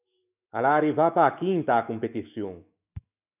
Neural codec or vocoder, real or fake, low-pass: autoencoder, 48 kHz, 128 numbers a frame, DAC-VAE, trained on Japanese speech; fake; 3.6 kHz